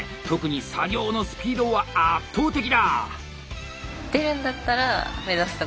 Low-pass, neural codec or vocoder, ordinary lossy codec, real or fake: none; none; none; real